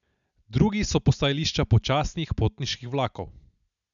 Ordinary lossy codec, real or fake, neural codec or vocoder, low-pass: none; real; none; 7.2 kHz